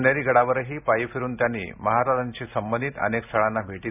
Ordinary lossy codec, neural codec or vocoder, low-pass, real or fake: none; none; 3.6 kHz; real